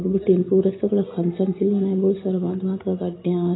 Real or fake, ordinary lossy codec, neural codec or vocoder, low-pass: real; AAC, 16 kbps; none; 7.2 kHz